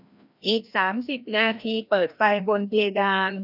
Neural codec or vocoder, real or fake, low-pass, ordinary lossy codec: codec, 16 kHz, 1 kbps, FreqCodec, larger model; fake; 5.4 kHz; none